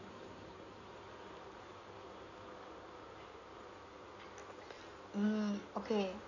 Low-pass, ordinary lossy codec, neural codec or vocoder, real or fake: 7.2 kHz; none; codec, 16 kHz in and 24 kHz out, 2.2 kbps, FireRedTTS-2 codec; fake